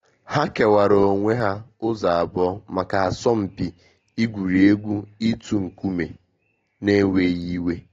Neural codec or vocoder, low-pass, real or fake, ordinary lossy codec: none; 7.2 kHz; real; AAC, 32 kbps